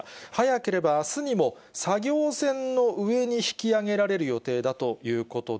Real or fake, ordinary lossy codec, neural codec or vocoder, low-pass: real; none; none; none